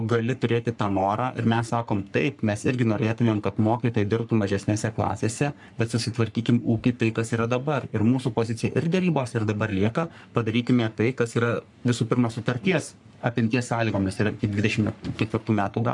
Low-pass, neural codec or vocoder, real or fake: 10.8 kHz; codec, 44.1 kHz, 3.4 kbps, Pupu-Codec; fake